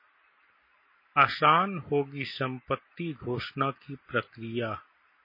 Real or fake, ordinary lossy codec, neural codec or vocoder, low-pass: real; MP3, 24 kbps; none; 5.4 kHz